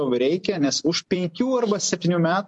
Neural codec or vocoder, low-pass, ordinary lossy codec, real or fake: vocoder, 44.1 kHz, 128 mel bands every 256 samples, BigVGAN v2; 10.8 kHz; MP3, 48 kbps; fake